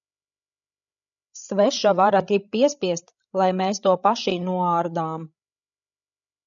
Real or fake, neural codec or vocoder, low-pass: fake; codec, 16 kHz, 8 kbps, FreqCodec, larger model; 7.2 kHz